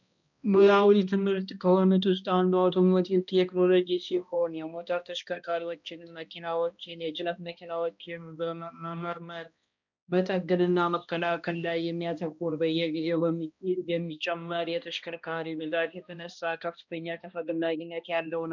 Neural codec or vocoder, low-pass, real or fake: codec, 16 kHz, 1 kbps, X-Codec, HuBERT features, trained on balanced general audio; 7.2 kHz; fake